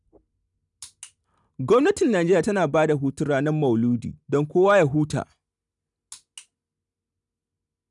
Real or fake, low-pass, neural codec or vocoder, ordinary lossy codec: real; 10.8 kHz; none; none